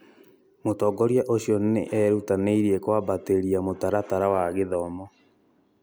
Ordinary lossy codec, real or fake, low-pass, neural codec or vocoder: none; real; none; none